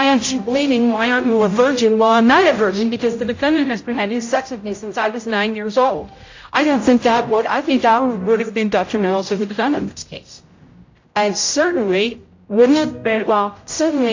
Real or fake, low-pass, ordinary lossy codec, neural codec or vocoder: fake; 7.2 kHz; AAC, 48 kbps; codec, 16 kHz, 0.5 kbps, X-Codec, HuBERT features, trained on general audio